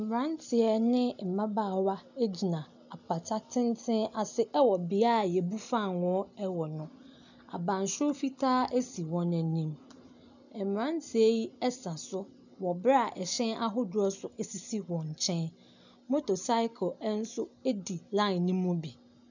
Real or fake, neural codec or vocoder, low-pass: real; none; 7.2 kHz